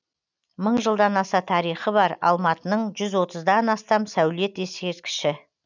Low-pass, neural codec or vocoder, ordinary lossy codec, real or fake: 7.2 kHz; none; none; real